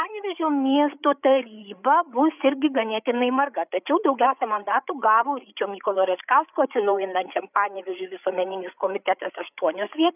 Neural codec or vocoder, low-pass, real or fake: codec, 16 kHz, 8 kbps, FreqCodec, larger model; 3.6 kHz; fake